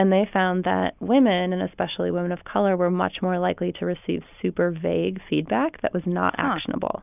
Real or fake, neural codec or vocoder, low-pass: real; none; 3.6 kHz